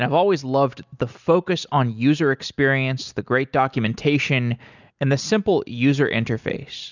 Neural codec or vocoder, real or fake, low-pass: none; real; 7.2 kHz